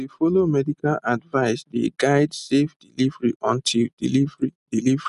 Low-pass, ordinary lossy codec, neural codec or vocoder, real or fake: 10.8 kHz; none; none; real